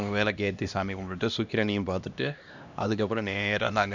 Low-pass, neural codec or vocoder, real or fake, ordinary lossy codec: 7.2 kHz; codec, 16 kHz, 1 kbps, X-Codec, HuBERT features, trained on LibriSpeech; fake; none